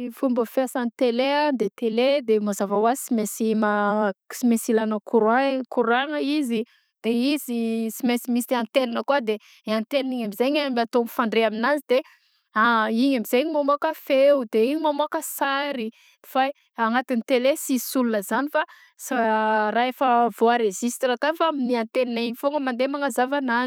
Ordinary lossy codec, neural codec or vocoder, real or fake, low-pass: none; vocoder, 44.1 kHz, 128 mel bands every 256 samples, BigVGAN v2; fake; none